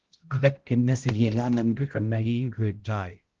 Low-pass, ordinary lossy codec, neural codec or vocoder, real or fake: 7.2 kHz; Opus, 16 kbps; codec, 16 kHz, 0.5 kbps, X-Codec, HuBERT features, trained on balanced general audio; fake